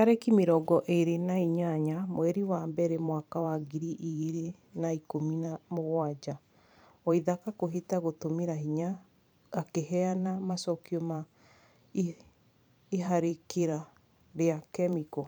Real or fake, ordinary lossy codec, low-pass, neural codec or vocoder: fake; none; none; vocoder, 44.1 kHz, 128 mel bands every 512 samples, BigVGAN v2